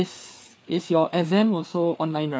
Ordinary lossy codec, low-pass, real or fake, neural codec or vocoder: none; none; fake; codec, 16 kHz, 4 kbps, FreqCodec, larger model